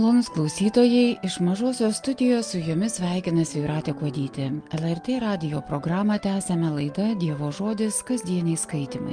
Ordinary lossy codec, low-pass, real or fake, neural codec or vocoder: Opus, 32 kbps; 9.9 kHz; real; none